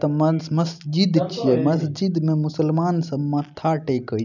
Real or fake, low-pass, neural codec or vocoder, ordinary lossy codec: real; 7.2 kHz; none; none